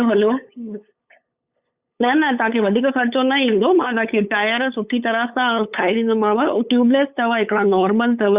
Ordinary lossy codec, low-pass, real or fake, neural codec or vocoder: Opus, 64 kbps; 3.6 kHz; fake; codec, 16 kHz, 8 kbps, FunCodec, trained on LibriTTS, 25 frames a second